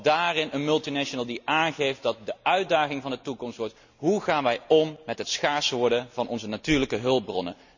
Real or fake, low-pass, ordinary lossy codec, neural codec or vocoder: real; 7.2 kHz; none; none